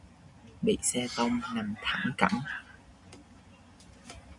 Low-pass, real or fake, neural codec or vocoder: 10.8 kHz; real; none